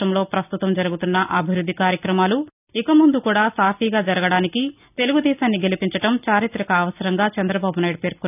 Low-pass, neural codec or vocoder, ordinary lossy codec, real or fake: 3.6 kHz; none; none; real